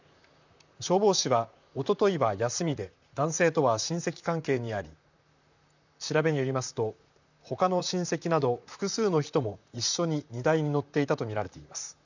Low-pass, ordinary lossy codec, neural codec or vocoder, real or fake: 7.2 kHz; none; vocoder, 44.1 kHz, 128 mel bands, Pupu-Vocoder; fake